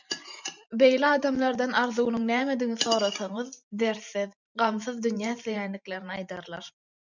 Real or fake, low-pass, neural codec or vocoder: fake; 7.2 kHz; vocoder, 44.1 kHz, 128 mel bands every 512 samples, BigVGAN v2